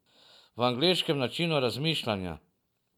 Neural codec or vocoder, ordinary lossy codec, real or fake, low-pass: none; none; real; 19.8 kHz